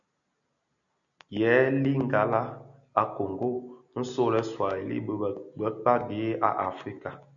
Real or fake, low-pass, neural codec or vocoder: real; 7.2 kHz; none